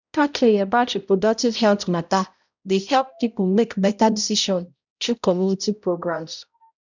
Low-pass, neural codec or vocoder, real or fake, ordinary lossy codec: 7.2 kHz; codec, 16 kHz, 0.5 kbps, X-Codec, HuBERT features, trained on balanced general audio; fake; none